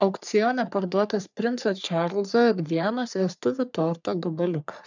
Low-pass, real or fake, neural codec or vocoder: 7.2 kHz; fake; codec, 44.1 kHz, 3.4 kbps, Pupu-Codec